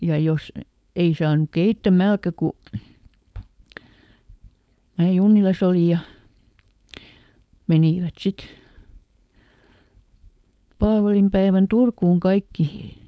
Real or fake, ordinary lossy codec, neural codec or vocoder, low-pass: fake; none; codec, 16 kHz, 4.8 kbps, FACodec; none